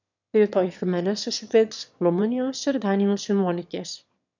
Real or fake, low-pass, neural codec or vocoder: fake; 7.2 kHz; autoencoder, 22.05 kHz, a latent of 192 numbers a frame, VITS, trained on one speaker